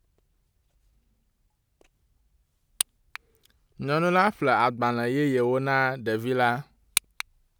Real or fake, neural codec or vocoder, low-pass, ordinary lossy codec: real; none; none; none